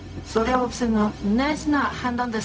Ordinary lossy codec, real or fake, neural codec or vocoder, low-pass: none; fake; codec, 16 kHz, 0.4 kbps, LongCat-Audio-Codec; none